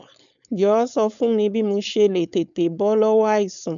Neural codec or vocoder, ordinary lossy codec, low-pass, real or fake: codec, 16 kHz, 4.8 kbps, FACodec; none; 7.2 kHz; fake